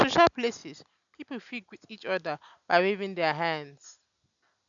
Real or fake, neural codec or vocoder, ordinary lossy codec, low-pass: real; none; none; 7.2 kHz